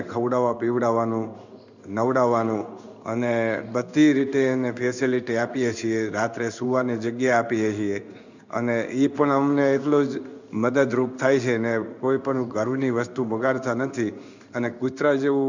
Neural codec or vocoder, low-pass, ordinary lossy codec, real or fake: codec, 16 kHz in and 24 kHz out, 1 kbps, XY-Tokenizer; 7.2 kHz; none; fake